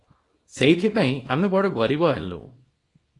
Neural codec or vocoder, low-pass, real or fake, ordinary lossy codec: codec, 24 kHz, 0.9 kbps, WavTokenizer, small release; 10.8 kHz; fake; AAC, 32 kbps